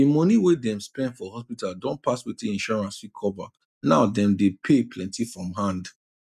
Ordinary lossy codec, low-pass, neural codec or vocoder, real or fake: none; 14.4 kHz; none; real